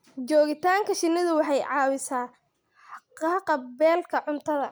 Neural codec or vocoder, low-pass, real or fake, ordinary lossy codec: none; none; real; none